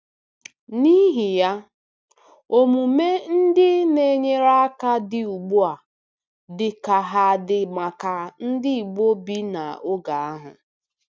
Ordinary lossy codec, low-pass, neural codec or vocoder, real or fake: none; none; none; real